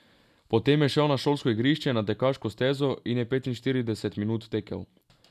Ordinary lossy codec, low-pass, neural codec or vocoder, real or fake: none; 14.4 kHz; none; real